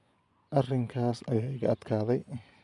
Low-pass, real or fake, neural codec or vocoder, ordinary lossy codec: 10.8 kHz; fake; vocoder, 24 kHz, 100 mel bands, Vocos; none